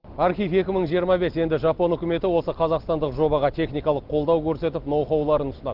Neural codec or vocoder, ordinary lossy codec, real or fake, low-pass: none; Opus, 16 kbps; real; 5.4 kHz